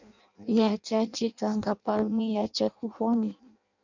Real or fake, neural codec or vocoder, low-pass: fake; codec, 16 kHz in and 24 kHz out, 0.6 kbps, FireRedTTS-2 codec; 7.2 kHz